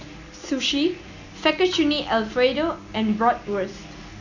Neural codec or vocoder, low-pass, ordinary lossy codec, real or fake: none; 7.2 kHz; none; real